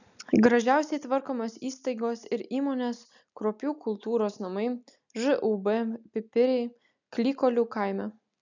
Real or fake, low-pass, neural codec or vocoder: real; 7.2 kHz; none